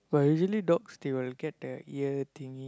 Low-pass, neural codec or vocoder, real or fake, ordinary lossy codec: none; none; real; none